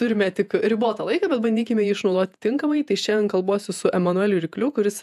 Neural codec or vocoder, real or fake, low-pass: vocoder, 48 kHz, 128 mel bands, Vocos; fake; 14.4 kHz